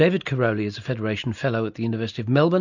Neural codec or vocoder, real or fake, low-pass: none; real; 7.2 kHz